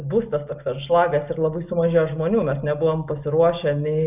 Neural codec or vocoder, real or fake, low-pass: none; real; 3.6 kHz